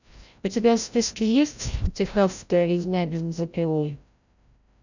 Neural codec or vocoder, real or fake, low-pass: codec, 16 kHz, 0.5 kbps, FreqCodec, larger model; fake; 7.2 kHz